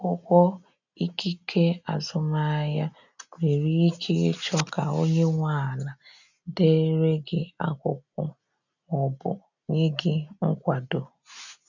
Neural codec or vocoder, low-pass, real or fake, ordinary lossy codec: none; 7.2 kHz; real; none